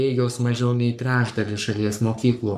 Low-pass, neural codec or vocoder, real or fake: 14.4 kHz; codec, 44.1 kHz, 3.4 kbps, Pupu-Codec; fake